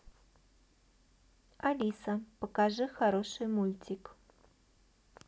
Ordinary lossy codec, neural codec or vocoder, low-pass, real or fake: none; none; none; real